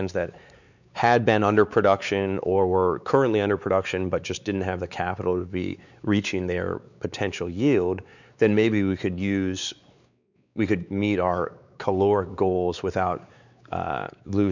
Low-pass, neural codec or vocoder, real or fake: 7.2 kHz; codec, 16 kHz, 4 kbps, X-Codec, WavLM features, trained on Multilingual LibriSpeech; fake